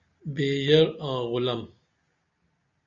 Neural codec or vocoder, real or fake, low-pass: none; real; 7.2 kHz